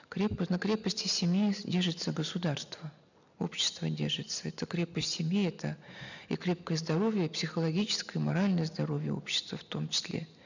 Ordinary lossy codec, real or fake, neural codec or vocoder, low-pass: none; real; none; 7.2 kHz